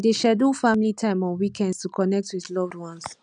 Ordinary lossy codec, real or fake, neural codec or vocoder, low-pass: none; real; none; 9.9 kHz